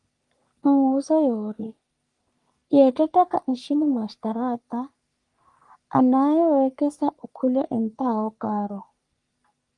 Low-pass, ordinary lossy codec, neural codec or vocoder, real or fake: 10.8 kHz; Opus, 32 kbps; codec, 44.1 kHz, 3.4 kbps, Pupu-Codec; fake